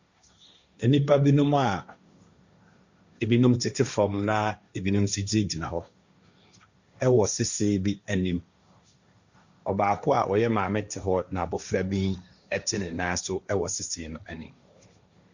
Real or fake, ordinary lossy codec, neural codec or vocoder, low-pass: fake; Opus, 64 kbps; codec, 16 kHz, 1.1 kbps, Voila-Tokenizer; 7.2 kHz